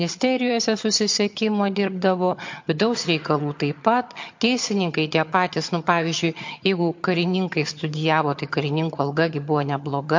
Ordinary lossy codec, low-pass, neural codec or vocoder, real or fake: MP3, 48 kbps; 7.2 kHz; vocoder, 22.05 kHz, 80 mel bands, HiFi-GAN; fake